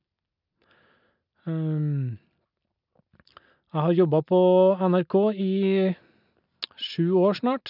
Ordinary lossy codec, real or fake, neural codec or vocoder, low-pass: none; real; none; 5.4 kHz